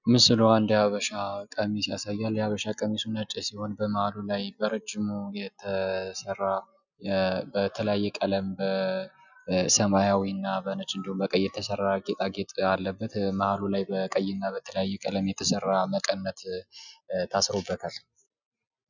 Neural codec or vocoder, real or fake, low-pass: none; real; 7.2 kHz